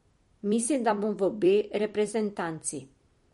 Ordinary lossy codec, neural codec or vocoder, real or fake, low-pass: MP3, 48 kbps; vocoder, 44.1 kHz, 128 mel bands every 256 samples, BigVGAN v2; fake; 19.8 kHz